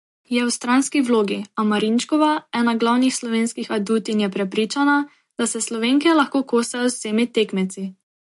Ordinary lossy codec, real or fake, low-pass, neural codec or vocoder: MP3, 48 kbps; real; 14.4 kHz; none